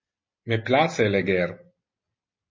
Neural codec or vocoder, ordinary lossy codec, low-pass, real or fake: none; MP3, 32 kbps; 7.2 kHz; real